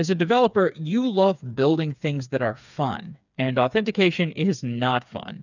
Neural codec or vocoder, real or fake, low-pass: codec, 16 kHz, 4 kbps, FreqCodec, smaller model; fake; 7.2 kHz